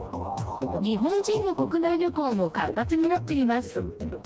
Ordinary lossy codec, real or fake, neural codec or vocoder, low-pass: none; fake; codec, 16 kHz, 1 kbps, FreqCodec, smaller model; none